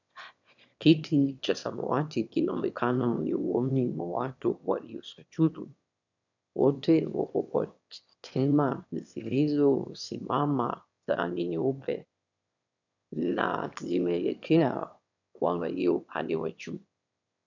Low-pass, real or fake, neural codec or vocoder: 7.2 kHz; fake; autoencoder, 22.05 kHz, a latent of 192 numbers a frame, VITS, trained on one speaker